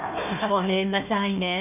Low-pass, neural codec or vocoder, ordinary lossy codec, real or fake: 3.6 kHz; codec, 16 kHz, 1 kbps, FunCodec, trained on Chinese and English, 50 frames a second; none; fake